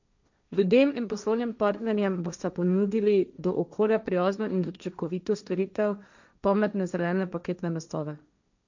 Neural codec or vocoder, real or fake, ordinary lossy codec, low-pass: codec, 16 kHz, 1.1 kbps, Voila-Tokenizer; fake; none; 7.2 kHz